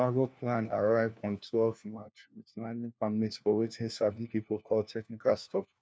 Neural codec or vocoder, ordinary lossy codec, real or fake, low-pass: codec, 16 kHz, 1 kbps, FunCodec, trained on LibriTTS, 50 frames a second; none; fake; none